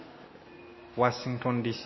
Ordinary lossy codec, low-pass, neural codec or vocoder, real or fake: MP3, 24 kbps; 7.2 kHz; none; real